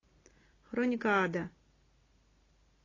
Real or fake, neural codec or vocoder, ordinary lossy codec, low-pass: real; none; AAC, 32 kbps; 7.2 kHz